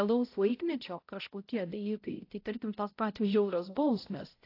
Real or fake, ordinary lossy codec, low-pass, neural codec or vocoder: fake; AAC, 32 kbps; 5.4 kHz; codec, 16 kHz, 0.5 kbps, X-Codec, HuBERT features, trained on balanced general audio